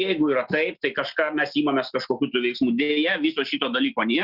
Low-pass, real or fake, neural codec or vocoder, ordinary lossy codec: 5.4 kHz; real; none; Opus, 64 kbps